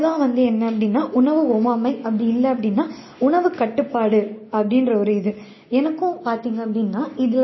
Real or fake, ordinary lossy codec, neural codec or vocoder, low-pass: real; MP3, 24 kbps; none; 7.2 kHz